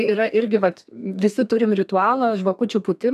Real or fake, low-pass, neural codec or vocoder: fake; 14.4 kHz; codec, 32 kHz, 1.9 kbps, SNAC